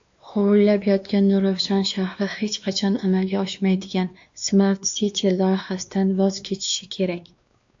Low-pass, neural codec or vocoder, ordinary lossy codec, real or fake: 7.2 kHz; codec, 16 kHz, 2 kbps, X-Codec, WavLM features, trained on Multilingual LibriSpeech; AAC, 64 kbps; fake